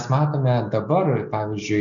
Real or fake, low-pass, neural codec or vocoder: real; 7.2 kHz; none